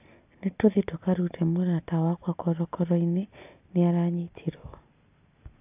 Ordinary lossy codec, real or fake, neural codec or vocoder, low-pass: AAC, 32 kbps; real; none; 3.6 kHz